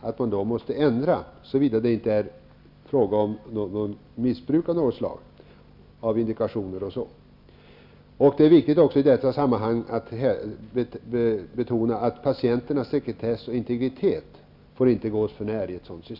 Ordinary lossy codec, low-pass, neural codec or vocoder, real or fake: none; 5.4 kHz; none; real